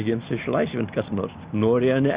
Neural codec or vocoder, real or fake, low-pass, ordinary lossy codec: none; real; 3.6 kHz; Opus, 64 kbps